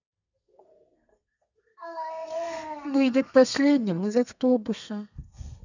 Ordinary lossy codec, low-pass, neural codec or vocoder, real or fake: none; 7.2 kHz; codec, 32 kHz, 1.9 kbps, SNAC; fake